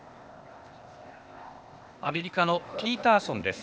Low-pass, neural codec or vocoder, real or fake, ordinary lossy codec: none; codec, 16 kHz, 0.8 kbps, ZipCodec; fake; none